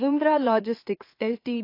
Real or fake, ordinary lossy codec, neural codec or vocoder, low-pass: fake; AAC, 32 kbps; autoencoder, 44.1 kHz, a latent of 192 numbers a frame, MeloTTS; 5.4 kHz